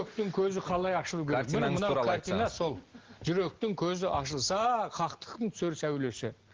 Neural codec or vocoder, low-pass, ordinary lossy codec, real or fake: none; 7.2 kHz; Opus, 16 kbps; real